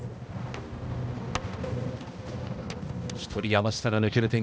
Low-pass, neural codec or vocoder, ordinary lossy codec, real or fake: none; codec, 16 kHz, 1 kbps, X-Codec, HuBERT features, trained on balanced general audio; none; fake